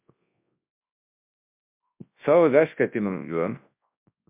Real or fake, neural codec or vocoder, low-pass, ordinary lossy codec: fake; codec, 24 kHz, 0.9 kbps, WavTokenizer, large speech release; 3.6 kHz; MP3, 32 kbps